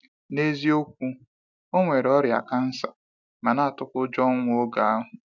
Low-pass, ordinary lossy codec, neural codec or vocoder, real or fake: 7.2 kHz; none; none; real